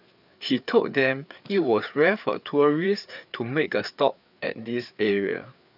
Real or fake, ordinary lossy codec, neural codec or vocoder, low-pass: fake; none; codec, 16 kHz, 4 kbps, FreqCodec, larger model; 5.4 kHz